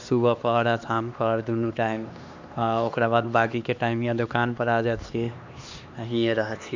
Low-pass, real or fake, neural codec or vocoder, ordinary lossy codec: 7.2 kHz; fake; codec, 16 kHz, 2 kbps, X-Codec, HuBERT features, trained on LibriSpeech; MP3, 64 kbps